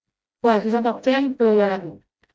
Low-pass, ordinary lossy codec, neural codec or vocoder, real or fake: none; none; codec, 16 kHz, 0.5 kbps, FreqCodec, smaller model; fake